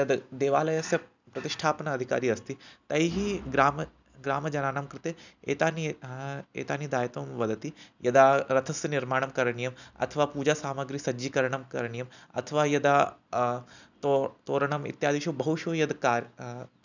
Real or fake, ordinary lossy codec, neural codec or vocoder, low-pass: real; none; none; 7.2 kHz